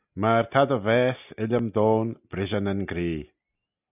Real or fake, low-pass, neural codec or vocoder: real; 3.6 kHz; none